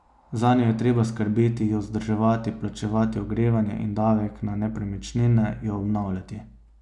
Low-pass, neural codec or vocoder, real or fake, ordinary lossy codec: 10.8 kHz; none; real; none